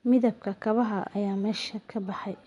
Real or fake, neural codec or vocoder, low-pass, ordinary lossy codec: fake; vocoder, 24 kHz, 100 mel bands, Vocos; 10.8 kHz; none